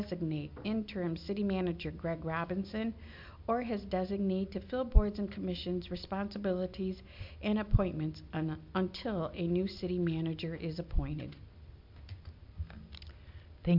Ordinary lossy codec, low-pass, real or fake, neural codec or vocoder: MP3, 48 kbps; 5.4 kHz; real; none